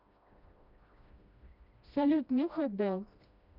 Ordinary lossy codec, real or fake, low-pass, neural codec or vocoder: none; fake; 5.4 kHz; codec, 16 kHz, 1 kbps, FreqCodec, smaller model